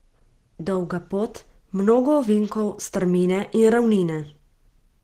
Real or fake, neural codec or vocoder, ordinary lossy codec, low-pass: real; none; Opus, 16 kbps; 14.4 kHz